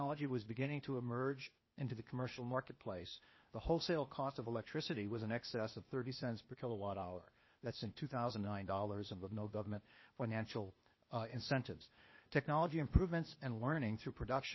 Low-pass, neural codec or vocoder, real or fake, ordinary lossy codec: 7.2 kHz; codec, 16 kHz, 0.8 kbps, ZipCodec; fake; MP3, 24 kbps